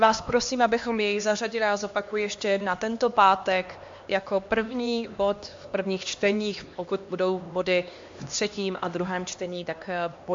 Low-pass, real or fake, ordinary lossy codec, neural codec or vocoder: 7.2 kHz; fake; MP3, 48 kbps; codec, 16 kHz, 2 kbps, X-Codec, HuBERT features, trained on LibriSpeech